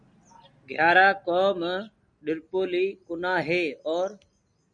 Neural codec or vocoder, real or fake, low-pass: none; real; 9.9 kHz